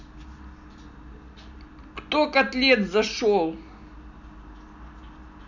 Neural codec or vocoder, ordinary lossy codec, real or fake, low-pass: none; none; real; 7.2 kHz